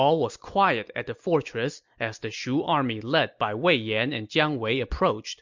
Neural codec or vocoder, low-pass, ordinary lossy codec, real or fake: none; 7.2 kHz; MP3, 64 kbps; real